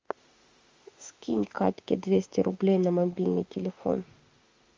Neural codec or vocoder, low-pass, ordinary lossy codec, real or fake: autoencoder, 48 kHz, 32 numbers a frame, DAC-VAE, trained on Japanese speech; 7.2 kHz; Opus, 32 kbps; fake